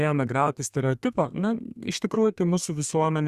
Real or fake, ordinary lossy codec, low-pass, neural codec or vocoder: fake; Opus, 64 kbps; 14.4 kHz; codec, 32 kHz, 1.9 kbps, SNAC